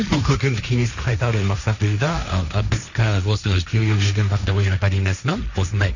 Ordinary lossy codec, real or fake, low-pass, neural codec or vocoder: none; fake; 7.2 kHz; codec, 16 kHz, 1.1 kbps, Voila-Tokenizer